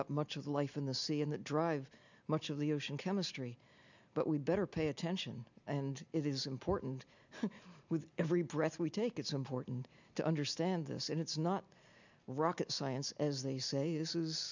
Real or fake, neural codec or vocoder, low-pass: real; none; 7.2 kHz